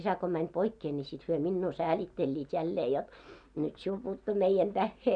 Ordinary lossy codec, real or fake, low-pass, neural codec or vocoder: none; real; 9.9 kHz; none